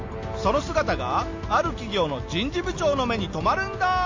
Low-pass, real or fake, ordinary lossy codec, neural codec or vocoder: 7.2 kHz; real; AAC, 48 kbps; none